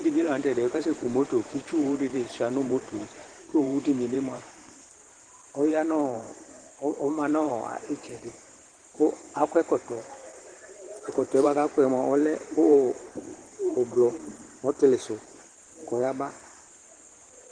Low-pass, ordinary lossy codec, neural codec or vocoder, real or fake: 9.9 kHz; Opus, 16 kbps; vocoder, 44.1 kHz, 128 mel bands every 512 samples, BigVGAN v2; fake